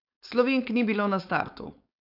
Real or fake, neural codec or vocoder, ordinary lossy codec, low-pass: fake; codec, 16 kHz, 4.8 kbps, FACodec; none; 5.4 kHz